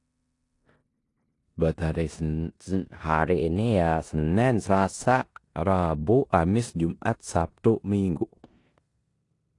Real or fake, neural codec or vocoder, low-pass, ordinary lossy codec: fake; codec, 16 kHz in and 24 kHz out, 0.9 kbps, LongCat-Audio-Codec, four codebook decoder; 10.8 kHz; AAC, 48 kbps